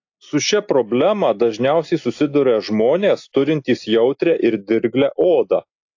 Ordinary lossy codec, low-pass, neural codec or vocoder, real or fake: AAC, 48 kbps; 7.2 kHz; none; real